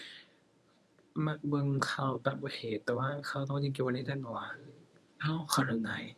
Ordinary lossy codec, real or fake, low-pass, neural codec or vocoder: none; fake; none; codec, 24 kHz, 0.9 kbps, WavTokenizer, medium speech release version 1